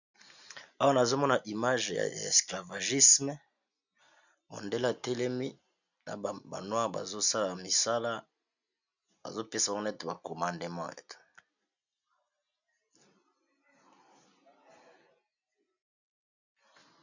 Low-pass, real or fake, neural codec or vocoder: 7.2 kHz; real; none